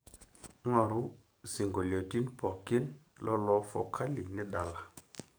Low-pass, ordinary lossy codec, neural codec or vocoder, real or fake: none; none; codec, 44.1 kHz, 7.8 kbps, DAC; fake